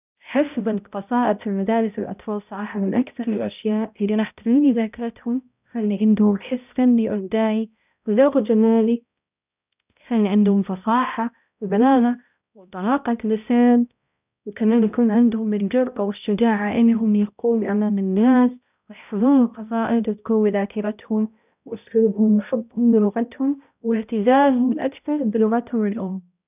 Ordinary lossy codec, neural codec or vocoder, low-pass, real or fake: none; codec, 16 kHz, 0.5 kbps, X-Codec, HuBERT features, trained on balanced general audio; 3.6 kHz; fake